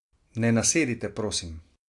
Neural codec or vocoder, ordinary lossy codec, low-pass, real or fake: none; AAC, 64 kbps; 10.8 kHz; real